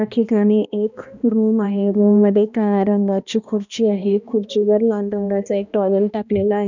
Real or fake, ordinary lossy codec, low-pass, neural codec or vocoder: fake; none; 7.2 kHz; codec, 16 kHz, 1 kbps, X-Codec, HuBERT features, trained on balanced general audio